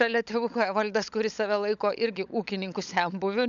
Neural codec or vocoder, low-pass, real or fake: codec, 16 kHz, 16 kbps, FunCodec, trained on LibriTTS, 50 frames a second; 7.2 kHz; fake